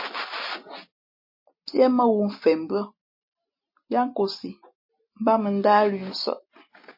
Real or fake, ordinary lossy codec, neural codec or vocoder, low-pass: real; MP3, 24 kbps; none; 5.4 kHz